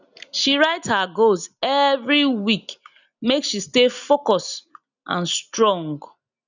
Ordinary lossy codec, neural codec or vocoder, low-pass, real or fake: none; none; 7.2 kHz; real